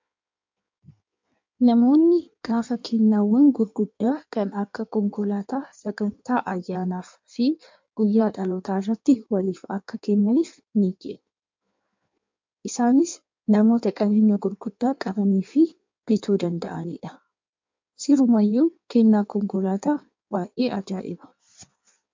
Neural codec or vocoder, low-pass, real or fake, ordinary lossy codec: codec, 16 kHz in and 24 kHz out, 1.1 kbps, FireRedTTS-2 codec; 7.2 kHz; fake; MP3, 64 kbps